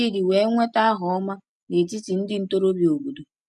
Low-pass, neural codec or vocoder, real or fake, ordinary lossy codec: none; none; real; none